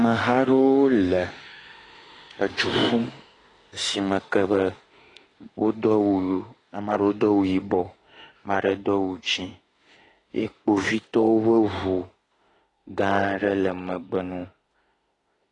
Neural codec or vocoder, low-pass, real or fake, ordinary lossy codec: autoencoder, 48 kHz, 32 numbers a frame, DAC-VAE, trained on Japanese speech; 10.8 kHz; fake; AAC, 32 kbps